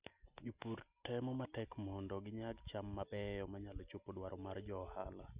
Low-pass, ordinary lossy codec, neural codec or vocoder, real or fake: 3.6 kHz; Opus, 64 kbps; none; real